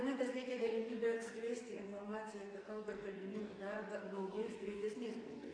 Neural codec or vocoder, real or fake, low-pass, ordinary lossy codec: codec, 44.1 kHz, 2.6 kbps, SNAC; fake; 9.9 kHz; AAC, 32 kbps